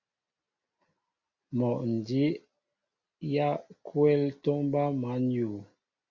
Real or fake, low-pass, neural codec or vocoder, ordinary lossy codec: real; 7.2 kHz; none; Opus, 64 kbps